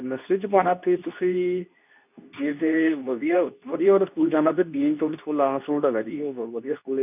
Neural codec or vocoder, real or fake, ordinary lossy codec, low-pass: codec, 24 kHz, 0.9 kbps, WavTokenizer, medium speech release version 2; fake; none; 3.6 kHz